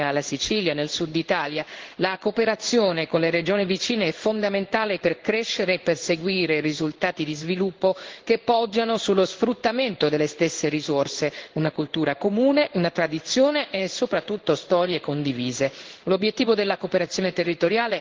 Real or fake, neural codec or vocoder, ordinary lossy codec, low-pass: fake; codec, 16 kHz in and 24 kHz out, 1 kbps, XY-Tokenizer; Opus, 16 kbps; 7.2 kHz